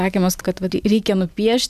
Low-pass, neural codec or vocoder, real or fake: 14.4 kHz; vocoder, 44.1 kHz, 128 mel bands every 256 samples, BigVGAN v2; fake